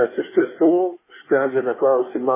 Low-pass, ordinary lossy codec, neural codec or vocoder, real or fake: 3.6 kHz; MP3, 16 kbps; codec, 24 kHz, 1 kbps, SNAC; fake